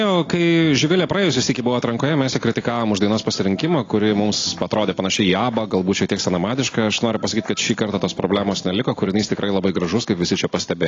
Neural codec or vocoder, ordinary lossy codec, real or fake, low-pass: none; AAC, 32 kbps; real; 7.2 kHz